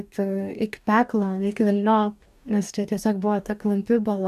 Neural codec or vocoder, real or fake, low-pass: codec, 44.1 kHz, 2.6 kbps, DAC; fake; 14.4 kHz